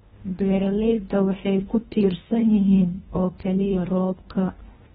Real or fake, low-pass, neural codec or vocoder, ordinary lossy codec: fake; 10.8 kHz; codec, 24 kHz, 1.5 kbps, HILCodec; AAC, 16 kbps